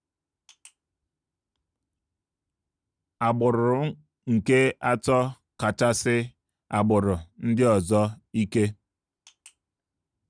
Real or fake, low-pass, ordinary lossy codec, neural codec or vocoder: real; 9.9 kHz; none; none